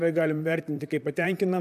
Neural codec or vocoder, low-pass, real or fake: vocoder, 44.1 kHz, 128 mel bands, Pupu-Vocoder; 14.4 kHz; fake